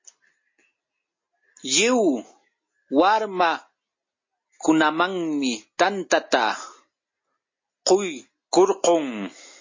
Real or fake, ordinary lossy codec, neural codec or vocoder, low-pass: real; MP3, 32 kbps; none; 7.2 kHz